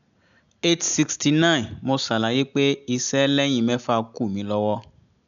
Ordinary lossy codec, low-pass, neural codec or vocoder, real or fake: none; 7.2 kHz; none; real